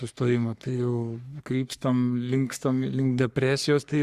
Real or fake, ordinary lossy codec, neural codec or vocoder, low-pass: fake; Opus, 64 kbps; codec, 44.1 kHz, 3.4 kbps, Pupu-Codec; 14.4 kHz